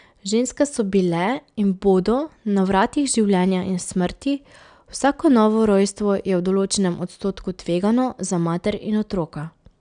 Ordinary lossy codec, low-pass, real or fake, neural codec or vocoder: none; 9.9 kHz; real; none